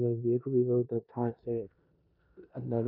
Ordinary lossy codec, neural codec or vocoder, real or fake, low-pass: AAC, 48 kbps; codec, 16 kHz in and 24 kHz out, 0.9 kbps, LongCat-Audio-Codec, four codebook decoder; fake; 5.4 kHz